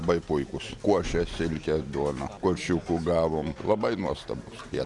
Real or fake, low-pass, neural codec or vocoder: real; 10.8 kHz; none